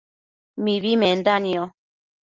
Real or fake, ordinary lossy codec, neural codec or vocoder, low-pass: real; Opus, 24 kbps; none; 7.2 kHz